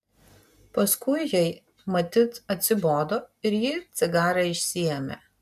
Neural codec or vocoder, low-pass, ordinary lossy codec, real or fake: none; 14.4 kHz; MP3, 96 kbps; real